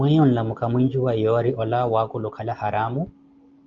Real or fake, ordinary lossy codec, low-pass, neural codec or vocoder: real; Opus, 32 kbps; 7.2 kHz; none